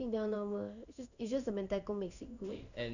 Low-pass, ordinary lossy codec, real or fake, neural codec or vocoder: 7.2 kHz; none; fake; codec, 16 kHz in and 24 kHz out, 1 kbps, XY-Tokenizer